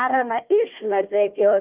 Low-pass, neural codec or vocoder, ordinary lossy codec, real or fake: 3.6 kHz; codec, 16 kHz, 1 kbps, FunCodec, trained on Chinese and English, 50 frames a second; Opus, 32 kbps; fake